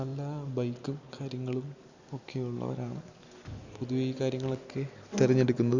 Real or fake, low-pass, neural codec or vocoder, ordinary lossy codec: real; 7.2 kHz; none; Opus, 64 kbps